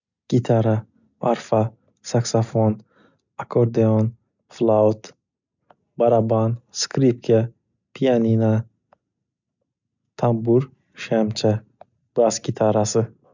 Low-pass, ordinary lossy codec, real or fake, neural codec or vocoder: 7.2 kHz; none; real; none